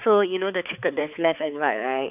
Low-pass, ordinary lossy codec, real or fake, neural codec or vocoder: 3.6 kHz; none; fake; codec, 16 kHz, 4 kbps, X-Codec, HuBERT features, trained on balanced general audio